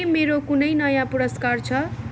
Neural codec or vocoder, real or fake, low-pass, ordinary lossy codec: none; real; none; none